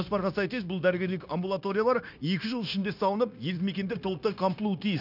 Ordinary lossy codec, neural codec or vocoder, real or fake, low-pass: none; codec, 16 kHz, 0.9 kbps, LongCat-Audio-Codec; fake; 5.4 kHz